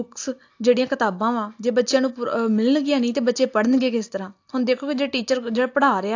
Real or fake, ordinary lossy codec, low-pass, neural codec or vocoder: real; AAC, 48 kbps; 7.2 kHz; none